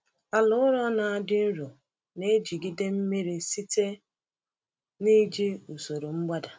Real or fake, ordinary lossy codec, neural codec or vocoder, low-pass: real; none; none; none